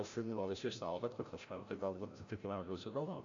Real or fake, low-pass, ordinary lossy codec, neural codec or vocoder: fake; 7.2 kHz; AAC, 48 kbps; codec, 16 kHz, 0.5 kbps, FreqCodec, larger model